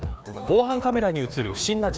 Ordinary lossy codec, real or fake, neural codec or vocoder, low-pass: none; fake; codec, 16 kHz, 2 kbps, FreqCodec, larger model; none